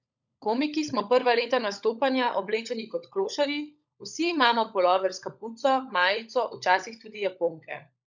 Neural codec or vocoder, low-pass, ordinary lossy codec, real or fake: codec, 16 kHz, 16 kbps, FunCodec, trained on LibriTTS, 50 frames a second; 7.2 kHz; none; fake